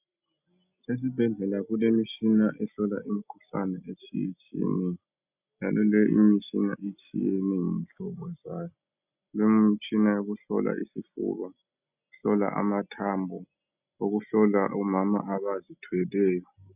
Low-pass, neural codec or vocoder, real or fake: 3.6 kHz; none; real